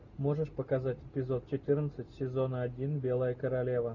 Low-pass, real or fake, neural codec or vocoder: 7.2 kHz; real; none